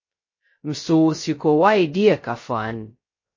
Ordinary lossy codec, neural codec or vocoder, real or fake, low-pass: MP3, 32 kbps; codec, 16 kHz, 0.2 kbps, FocalCodec; fake; 7.2 kHz